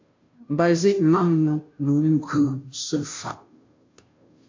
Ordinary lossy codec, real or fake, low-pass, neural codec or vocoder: MP3, 64 kbps; fake; 7.2 kHz; codec, 16 kHz, 0.5 kbps, FunCodec, trained on Chinese and English, 25 frames a second